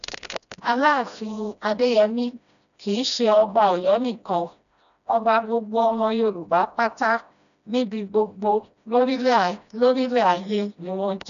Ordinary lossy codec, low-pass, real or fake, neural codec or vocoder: none; 7.2 kHz; fake; codec, 16 kHz, 1 kbps, FreqCodec, smaller model